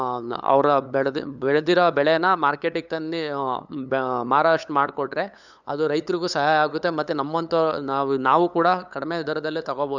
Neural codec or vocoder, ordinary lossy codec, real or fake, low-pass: codec, 16 kHz, 8 kbps, FunCodec, trained on LibriTTS, 25 frames a second; none; fake; 7.2 kHz